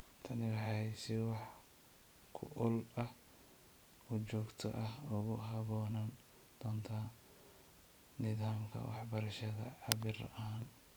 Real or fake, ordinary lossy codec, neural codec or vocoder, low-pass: fake; none; vocoder, 44.1 kHz, 128 mel bands every 512 samples, BigVGAN v2; none